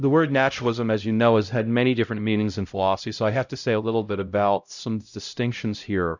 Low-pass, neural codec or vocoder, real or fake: 7.2 kHz; codec, 16 kHz, 0.5 kbps, X-Codec, HuBERT features, trained on LibriSpeech; fake